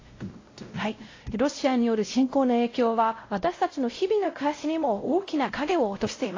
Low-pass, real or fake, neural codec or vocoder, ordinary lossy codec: 7.2 kHz; fake; codec, 16 kHz, 0.5 kbps, X-Codec, WavLM features, trained on Multilingual LibriSpeech; AAC, 32 kbps